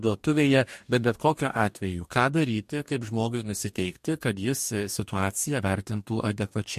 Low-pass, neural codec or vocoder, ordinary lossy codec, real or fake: 14.4 kHz; codec, 44.1 kHz, 2.6 kbps, DAC; MP3, 64 kbps; fake